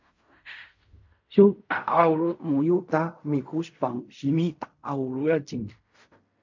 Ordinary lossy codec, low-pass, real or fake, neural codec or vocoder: MP3, 48 kbps; 7.2 kHz; fake; codec, 16 kHz in and 24 kHz out, 0.4 kbps, LongCat-Audio-Codec, fine tuned four codebook decoder